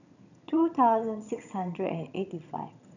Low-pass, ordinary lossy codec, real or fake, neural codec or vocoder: 7.2 kHz; none; fake; vocoder, 22.05 kHz, 80 mel bands, HiFi-GAN